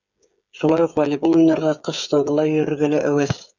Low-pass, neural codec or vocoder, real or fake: 7.2 kHz; codec, 16 kHz, 8 kbps, FreqCodec, smaller model; fake